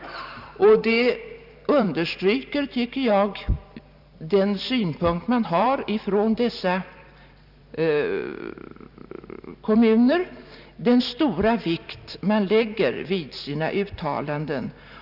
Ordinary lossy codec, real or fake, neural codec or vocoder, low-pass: none; fake; vocoder, 44.1 kHz, 128 mel bands every 512 samples, BigVGAN v2; 5.4 kHz